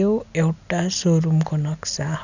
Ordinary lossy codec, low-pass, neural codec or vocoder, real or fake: none; 7.2 kHz; none; real